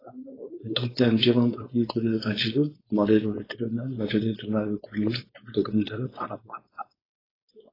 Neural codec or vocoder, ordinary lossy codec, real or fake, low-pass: codec, 16 kHz, 4.8 kbps, FACodec; AAC, 24 kbps; fake; 5.4 kHz